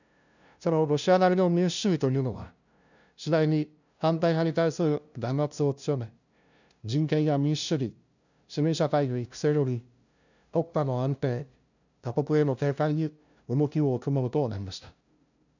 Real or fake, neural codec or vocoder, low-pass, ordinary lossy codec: fake; codec, 16 kHz, 0.5 kbps, FunCodec, trained on LibriTTS, 25 frames a second; 7.2 kHz; none